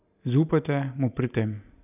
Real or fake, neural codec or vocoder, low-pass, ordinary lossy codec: real; none; 3.6 kHz; none